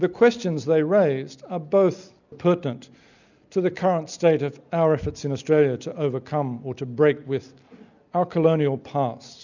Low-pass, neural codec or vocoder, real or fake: 7.2 kHz; none; real